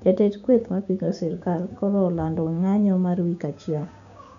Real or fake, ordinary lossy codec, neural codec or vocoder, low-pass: fake; none; codec, 16 kHz, 6 kbps, DAC; 7.2 kHz